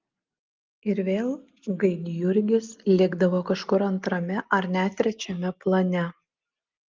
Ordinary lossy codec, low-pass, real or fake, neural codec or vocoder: Opus, 32 kbps; 7.2 kHz; real; none